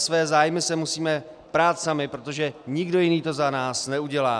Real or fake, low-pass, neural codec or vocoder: real; 9.9 kHz; none